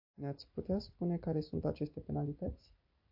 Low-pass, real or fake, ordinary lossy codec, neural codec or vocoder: 5.4 kHz; real; AAC, 48 kbps; none